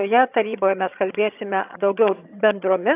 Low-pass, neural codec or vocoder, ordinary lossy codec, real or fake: 3.6 kHz; vocoder, 22.05 kHz, 80 mel bands, HiFi-GAN; AAC, 32 kbps; fake